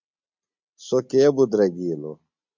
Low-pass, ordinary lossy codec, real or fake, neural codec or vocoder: 7.2 kHz; MP3, 64 kbps; real; none